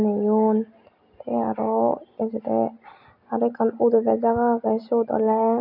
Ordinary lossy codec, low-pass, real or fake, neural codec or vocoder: none; 5.4 kHz; real; none